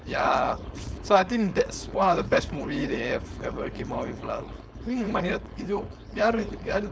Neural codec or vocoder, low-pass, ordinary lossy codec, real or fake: codec, 16 kHz, 4.8 kbps, FACodec; none; none; fake